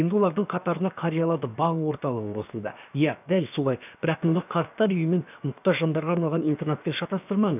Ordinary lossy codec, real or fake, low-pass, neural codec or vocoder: none; fake; 3.6 kHz; codec, 16 kHz, about 1 kbps, DyCAST, with the encoder's durations